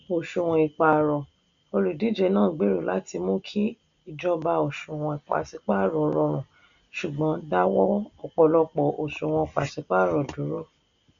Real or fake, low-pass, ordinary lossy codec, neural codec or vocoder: real; 7.2 kHz; none; none